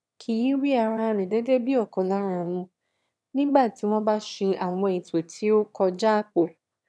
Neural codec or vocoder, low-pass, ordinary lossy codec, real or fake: autoencoder, 22.05 kHz, a latent of 192 numbers a frame, VITS, trained on one speaker; none; none; fake